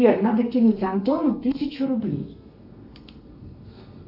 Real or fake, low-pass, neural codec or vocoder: fake; 5.4 kHz; codec, 32 kHz, 1.9 kbps, SNAC